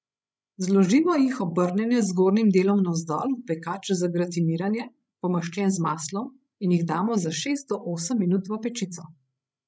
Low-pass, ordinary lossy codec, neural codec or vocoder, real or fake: none; none; codec, 16 kHz, 16 kbps, FreqCodec, larger model; fake